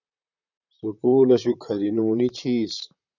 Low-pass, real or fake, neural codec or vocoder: 7.2 kHz; fake; vocoder, 44.1 kHz, 128 mel bands, Pupu-Vocoder